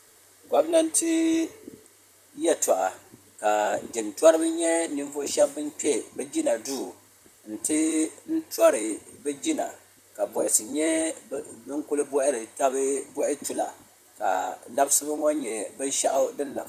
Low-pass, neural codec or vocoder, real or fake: 14.4 kHz; vocoder, 44.1 kHz, 128 mel bands, Pupu-Vocoder; fake